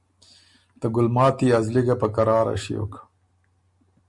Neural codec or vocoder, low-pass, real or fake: none; 10.8 kHz; real